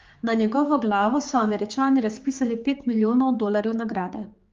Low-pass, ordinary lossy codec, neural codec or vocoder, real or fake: 7.2 kHz; Opus, 32 kbps; codec, 16 kHz, 4 kbps, X-Codec, HuBERT features, trained on general audio; fake